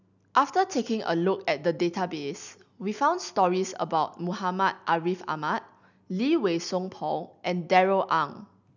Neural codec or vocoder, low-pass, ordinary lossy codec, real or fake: none; 7.2 kHz; none; real